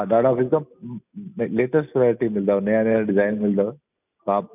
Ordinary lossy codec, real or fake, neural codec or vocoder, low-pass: none; real; none; 3.6 kHz